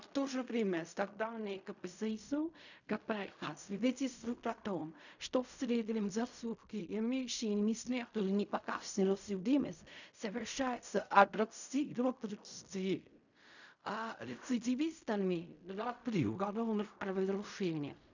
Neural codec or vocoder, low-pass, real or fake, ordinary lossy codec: codec, 16 kHz in and 24 kHz out, 0.4 kbps, LongCat-Audio-Codec, fine tuned four codebook decoder; 7.2 kHz; fake; none